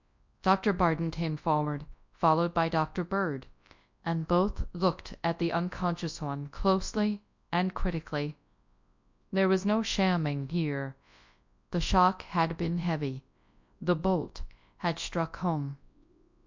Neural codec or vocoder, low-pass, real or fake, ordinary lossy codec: codec, 24 kHz, 0.9 kbps, WavTokenizer, large speech release; 7.2 kHz; fake; MP3, 64 kbps